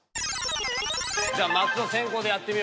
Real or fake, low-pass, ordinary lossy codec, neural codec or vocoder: real; none; none; none